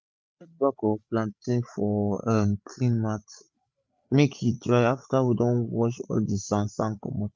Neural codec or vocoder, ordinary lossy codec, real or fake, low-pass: codec, 16 kHz, 8 kbps, FreqCodec, larger model; none; fake; none